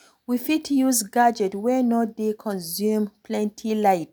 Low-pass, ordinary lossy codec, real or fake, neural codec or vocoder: none; none; real; none